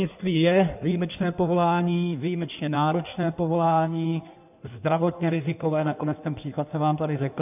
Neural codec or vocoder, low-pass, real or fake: codec, 16 kHz in and 24 kHz out, 1.1 kbps, FireRedTTS-2 codec; 3.6 kHz; fake